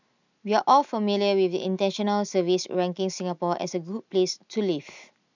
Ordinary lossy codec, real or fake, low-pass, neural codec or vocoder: none; real; 7.2 kHz; none